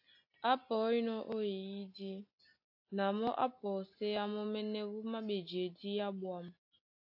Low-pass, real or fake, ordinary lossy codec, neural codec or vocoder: 5.4 kHz; real; AAC, 48 kbps; none